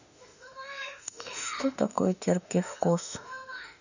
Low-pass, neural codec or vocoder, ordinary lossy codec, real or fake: 7.2 kHz; autoencoder, 48 kHz, 32 numbers a frame, DAC-VAE, trained on Japanese speech; none; fake